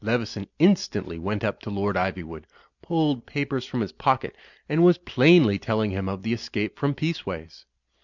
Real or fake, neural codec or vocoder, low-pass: real; none; 7.2 kHz